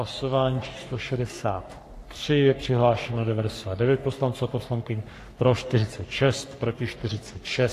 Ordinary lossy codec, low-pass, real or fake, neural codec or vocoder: AAC, 64 kbps; 14.4 kHz; fake; codec, 44.1 kHz, 3.4 kbps, Pupu-Codec